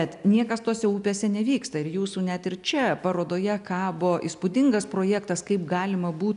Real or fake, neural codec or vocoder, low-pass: real; none; 10.8 kHz